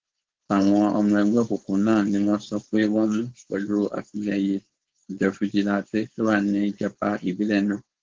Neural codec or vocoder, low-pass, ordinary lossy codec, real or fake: codec, 16 kHz, 4.8 kbps, FACodec; 7.2 kHz; Opus, 16 kbps; fake